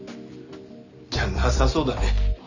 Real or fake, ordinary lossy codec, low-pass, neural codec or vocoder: real; none; 7.2 kHz; none